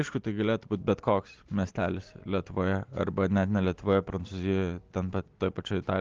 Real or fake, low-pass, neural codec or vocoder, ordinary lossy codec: real; 7.2 kHz; none; Opus, 16 kbps